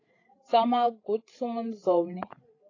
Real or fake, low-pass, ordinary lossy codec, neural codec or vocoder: fake; 7.2 kHz; AAC, 32 kbps; codec, 16 kHz, 8 kbps, FreqCodec, larger model